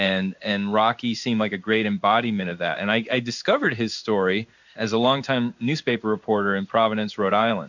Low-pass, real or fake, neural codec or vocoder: 7.2 kHz; fake; codec, 16 kHz in and 24 kHz out, 1 kbps, XY-Tokenizer